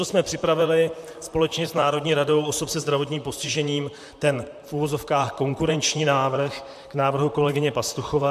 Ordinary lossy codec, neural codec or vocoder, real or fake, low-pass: MP3, 96 kbps; vocoder, 44.1 kHz, 128 mel bands, Pupu-Vocoder; fake; 14.4 kHz